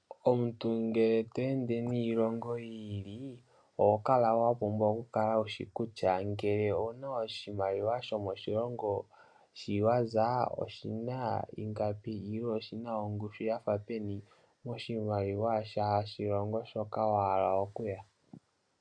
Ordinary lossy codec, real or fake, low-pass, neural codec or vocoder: MP3, 96 kbps; real; 9.9 kHz; none